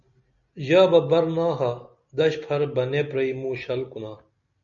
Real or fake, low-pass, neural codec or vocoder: real; 7.2 kHz; none